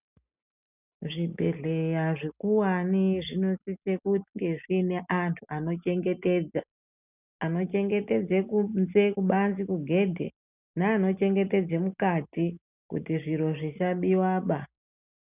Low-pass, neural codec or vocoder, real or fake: 3.6 kHz; none; real